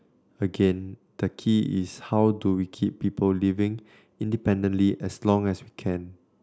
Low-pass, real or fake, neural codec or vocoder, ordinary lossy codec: none; real; none; none